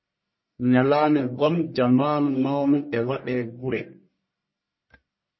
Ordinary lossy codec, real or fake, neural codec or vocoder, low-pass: MP3, 24 kbps; fake; codec, 44.1 kHz, 1.7 kbps, Pupu-Codec; 7.2 kHz